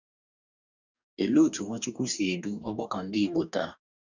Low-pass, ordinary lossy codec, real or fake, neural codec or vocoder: 7.2 kHz; none; fake; codec, 44.1 kHz, 2.6 kbps, DAC